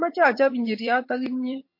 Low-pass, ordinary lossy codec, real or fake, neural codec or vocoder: 5.4 kHz; MP3, 32 kbps; fake; vocoder, 22.05 kHz, 80 mel bands, HiFi-GAN